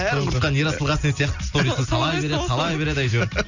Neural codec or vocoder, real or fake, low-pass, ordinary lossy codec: none; real; 7.2 kHz; none